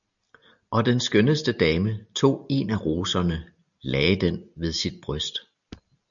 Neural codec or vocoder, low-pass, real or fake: none; 7.2 kHz; real